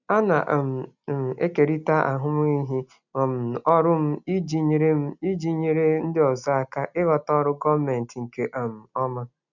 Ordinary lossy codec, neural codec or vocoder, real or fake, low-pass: none; none; real; 7.2 kHz